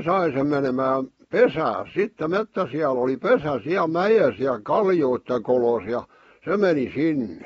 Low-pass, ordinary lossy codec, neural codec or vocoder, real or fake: 19.8 kHz; AAC, 24 kbps; none; real